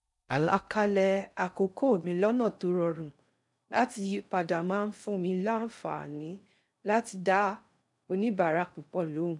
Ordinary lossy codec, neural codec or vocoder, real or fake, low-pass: none; codec, 16 kHz in and 24 kHz out, 0.6 kbps, FocalCodec, streaming, 2048 codes; fake; 10.8 kHz